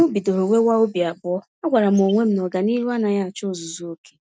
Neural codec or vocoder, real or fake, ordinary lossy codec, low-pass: none; real; none; none